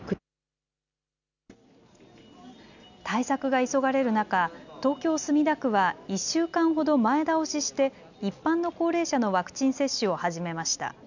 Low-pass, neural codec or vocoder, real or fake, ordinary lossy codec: 7.2 kHz; none; real; none